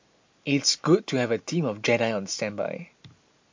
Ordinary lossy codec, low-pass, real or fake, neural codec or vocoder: MP3, 48 kbps; 7.2 kHz; real; none